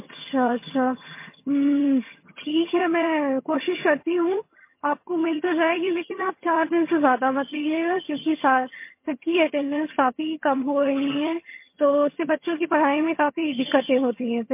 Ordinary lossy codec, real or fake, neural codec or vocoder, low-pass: MP3, 24 kbps; fake; vocoder, 22.05 kHz, 80 mel bands, HiFi-GAN; 3.6 kHz